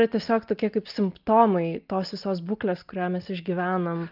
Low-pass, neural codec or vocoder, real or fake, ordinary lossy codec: 5.4 kHz; none; real; Opus, 24 kbps